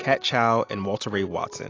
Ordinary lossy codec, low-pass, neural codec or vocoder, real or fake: AAC, 32 kbps; 7.2 kHz; none; real